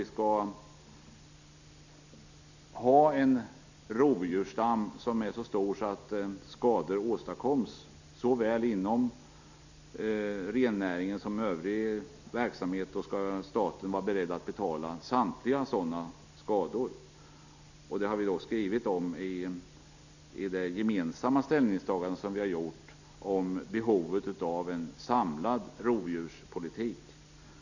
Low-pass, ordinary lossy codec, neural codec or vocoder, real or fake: 7.2 kHz; none; none; real